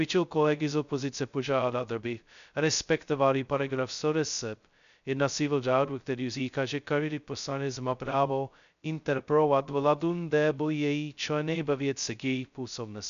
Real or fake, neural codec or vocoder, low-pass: fake; codec, 16 kHz, 0.2 kbps, FocalCodec; 7.2 kHz